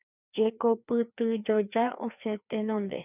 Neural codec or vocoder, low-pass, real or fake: codec, 16 kHz, 2 kbps, FreqCodec, larger model; 3.6 kHz; fake